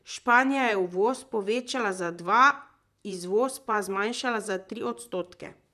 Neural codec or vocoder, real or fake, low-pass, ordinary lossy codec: vocoder, 44.1 kHz, 128 mel bands, Pupu-Vocoder; fake; 14.4 kHz; none